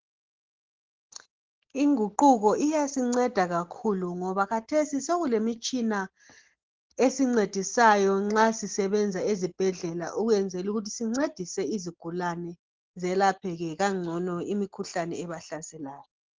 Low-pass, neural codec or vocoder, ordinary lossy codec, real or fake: 7.2 kHz; none; Opus, 16 kbps; real